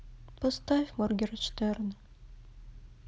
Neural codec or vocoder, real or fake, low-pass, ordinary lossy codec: none; real; none; none